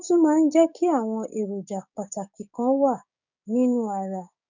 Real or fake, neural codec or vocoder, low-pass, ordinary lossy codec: fake; codec, 16 kHz, 6 kbps, DAC; 7.2 kHz; none